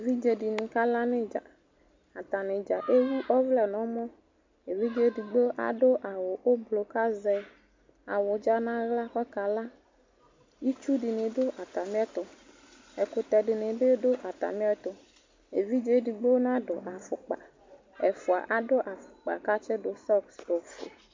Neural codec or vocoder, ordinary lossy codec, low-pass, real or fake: none; MP3, 64 kbps; 7.2 kHz; real